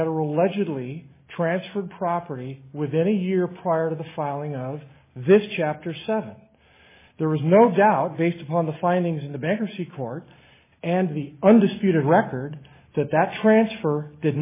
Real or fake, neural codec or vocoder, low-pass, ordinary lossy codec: real; none; 3.6 kHz; MP3, 16 kbps